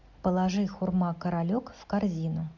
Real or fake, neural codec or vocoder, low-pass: real; none; 7.2 kHz